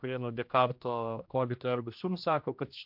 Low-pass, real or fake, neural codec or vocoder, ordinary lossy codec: 5.4 kHz; fake; codec, 16 kHz, 1 kbps, X-Codec, HuBERT features, trained on general audio; MP3, 48 kbps